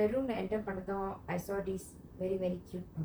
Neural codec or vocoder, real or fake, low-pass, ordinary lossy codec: vocoder, 44.1 kHz, 128 mel bands, Pupu-Vocoder; fake; none; none